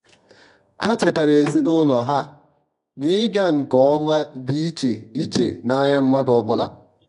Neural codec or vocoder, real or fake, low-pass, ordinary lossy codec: codec, 24 kHz, 0.9 kbps, WavTokenizer, medium music audio release; fake; 10.8 kHz; none